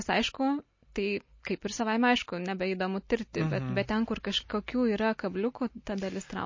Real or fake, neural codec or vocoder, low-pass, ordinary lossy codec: real; none; 7.2 kHz; MP3, 32 kbps